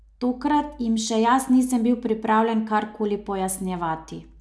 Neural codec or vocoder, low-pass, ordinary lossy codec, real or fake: none; none; none; real